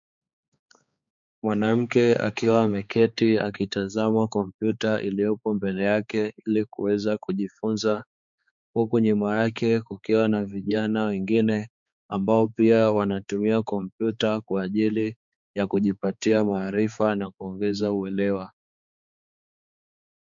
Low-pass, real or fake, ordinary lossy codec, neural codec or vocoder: 7.2 kHz; fake; MP3, 64 kbps; codec, 16 kHz, 4 kbps, X-Codec, HuBERT features, trained on balanced general audio